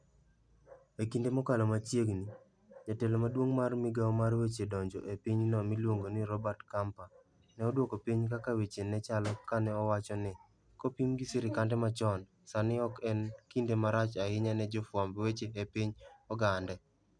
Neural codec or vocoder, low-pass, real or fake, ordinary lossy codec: none; 9.9 kHz; real; none